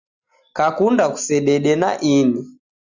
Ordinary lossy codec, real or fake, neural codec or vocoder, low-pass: Opus, 64 kbps; real; none; 7.2 kHz